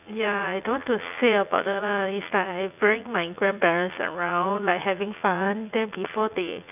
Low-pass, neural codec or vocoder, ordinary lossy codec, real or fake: 3.6 kHz; vocoder, 44.1 kHz, 80 mel bands, Vocos; none; fake